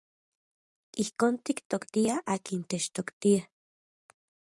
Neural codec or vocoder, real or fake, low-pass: vocoder, 44.1 kHz, 128 mel bands every 512 samples, BigVGAN v2; fake; 10.8 kHz